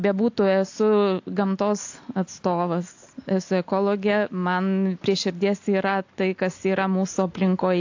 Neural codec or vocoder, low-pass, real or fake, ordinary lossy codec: none; 7.2 kHz; real; AAC, 48 kbps